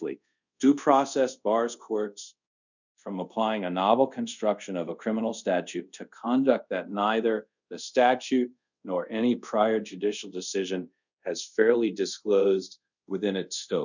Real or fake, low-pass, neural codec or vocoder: fake; 7.2 kHz; codec, 24 kHz, 0.5 kbps, DualCodec